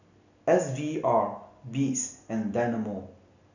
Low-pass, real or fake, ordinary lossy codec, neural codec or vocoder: 7.2 kHz; real; none; none